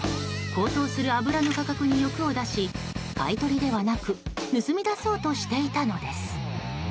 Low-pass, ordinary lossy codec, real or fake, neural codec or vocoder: none; none; real; none